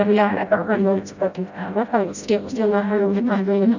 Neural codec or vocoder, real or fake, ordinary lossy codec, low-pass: codec, 16 kHz, 0.5 kbps, FreqCodec, smaller model; fake; none; 7.2 kHz